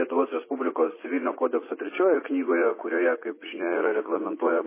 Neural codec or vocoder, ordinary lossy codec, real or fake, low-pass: vocoder, 44.1 kHz, 80 mel bands, Vocos; MP3, 16 kbps; fake; 3.6 kHz